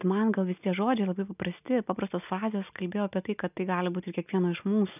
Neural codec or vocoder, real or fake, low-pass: none; real; 3.6 kHz